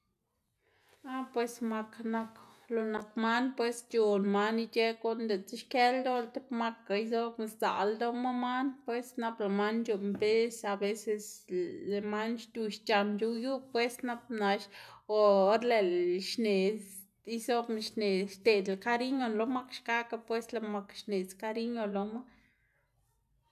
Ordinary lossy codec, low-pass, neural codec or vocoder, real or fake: none; 14.4 kHz; none; real